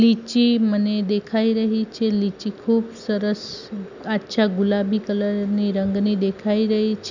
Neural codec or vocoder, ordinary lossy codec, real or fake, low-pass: none; none; real; 7.2 kHz